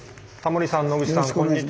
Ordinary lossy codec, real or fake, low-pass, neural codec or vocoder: none; real; none; none